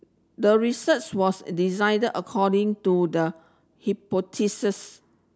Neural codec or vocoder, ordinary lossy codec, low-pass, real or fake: none; none; none; real